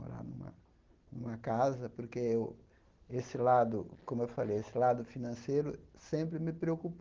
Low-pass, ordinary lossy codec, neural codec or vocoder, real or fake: 7.2 kHz; Opus, 16 kbps; none; real